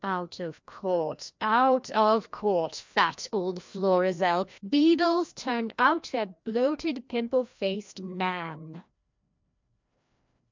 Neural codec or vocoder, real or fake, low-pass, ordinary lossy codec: codec, 16 kHz, 1 kbps, FreqCodec, larger model; fake; 7.2 kHz; MP3, 64 kbps